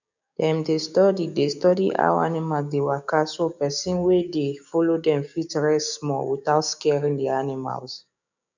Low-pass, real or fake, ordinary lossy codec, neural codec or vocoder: 7.2 kHz; fake; none; codec, 16 kHz, 6 kbps, DAC